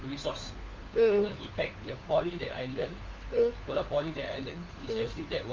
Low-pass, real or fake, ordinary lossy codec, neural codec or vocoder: 7.2 kHz; fake; Opus, 32 kbps; codec, 16 kHz, 4 kbps, FunCodec, trained on LibriTTS, 50 frames a second